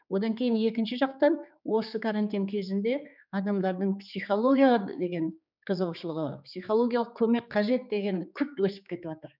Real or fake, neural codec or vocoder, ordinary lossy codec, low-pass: fake; codec, 16 kHz, 4 kbps, X-Codec, HuBERT features, trained on general audio; none; 5.4 kHz